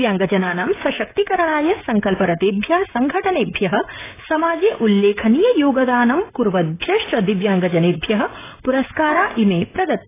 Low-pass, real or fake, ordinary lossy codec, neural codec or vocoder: 3.6 kHz; fake; AAC, 16 kbps; codec, 16 kHz, 16 kbps, FreqCodec, smaller model